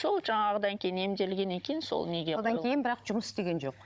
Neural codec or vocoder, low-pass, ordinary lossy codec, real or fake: codec, 16 kHz, 16 kbps, FunCodec, trained on Chinese and English, 50 frames a second; none; none; fake